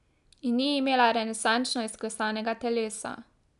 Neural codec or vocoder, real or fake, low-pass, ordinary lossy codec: none; real; 10.8 kHz; none